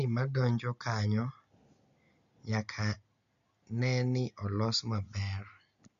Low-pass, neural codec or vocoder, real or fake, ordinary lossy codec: 7.2 kHz; none; real; MP3, 64 kbps